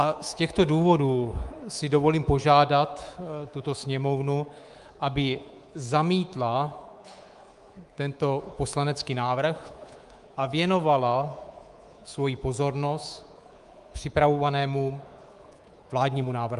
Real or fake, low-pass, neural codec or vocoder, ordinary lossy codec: fake; 10.8 kHz; codec, 24 kHz, 3.1 kbps, DualCodec; Opus, 32 kbps